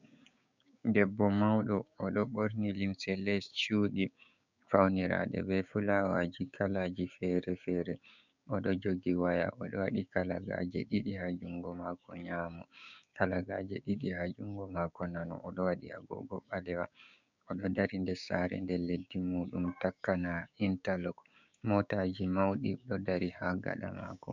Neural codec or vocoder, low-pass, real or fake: codec, 16 kHz, 6 kbps, DAC; 7.2 kHz; fake